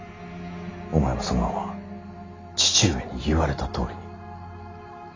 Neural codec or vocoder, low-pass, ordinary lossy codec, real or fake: none; 7.2 kHz; AAC, 32 kbps; real